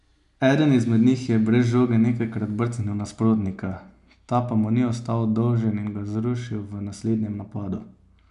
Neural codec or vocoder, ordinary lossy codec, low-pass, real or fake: none; none; 10.8 kHz; real